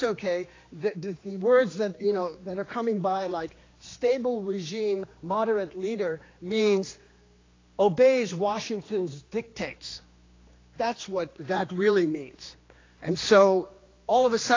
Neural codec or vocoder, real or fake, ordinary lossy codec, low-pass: codec, 16 kHz, 2 kbps, X-Codec, HuBERT features, trained on general audio; fake; AAC, 32 kbps; 7.2 kHz